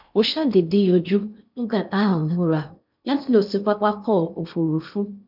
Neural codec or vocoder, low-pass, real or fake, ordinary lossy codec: codec, 16 kHz in and 24 kHz out, 0.6 kbps, FocalCodec, streaming, 4096 codes; 5.4 kHz; fake; none